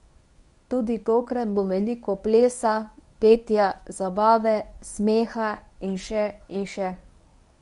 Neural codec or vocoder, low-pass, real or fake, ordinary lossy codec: codec, 24 kHz, 0.9 kbps, WavTokenizer, medium speech release version 1; 10.8 kHz; fake; MP3, 96 kbps